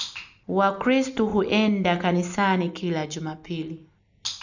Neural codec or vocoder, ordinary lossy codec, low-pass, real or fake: none; none; 7.2 kHz; real